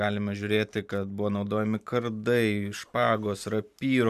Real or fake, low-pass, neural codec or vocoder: fake; 14.4 kHz; vocoder, 44.1 kHz, 128 mel bands every 512 samples, BigVGAN v2